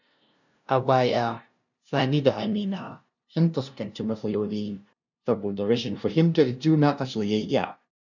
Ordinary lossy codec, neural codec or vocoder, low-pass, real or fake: none; codec, 16 kHz, 0.5 kbps, FunCodec, trained on LibriTTS, 25 frames a second; 7.2 kHz; fake